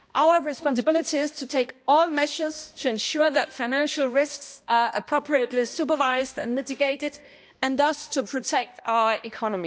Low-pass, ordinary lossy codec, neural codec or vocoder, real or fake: none; none; codec, 16 kHz, 1 kbps, X-Codec, HuBERT features, trained on balanced general audio; fake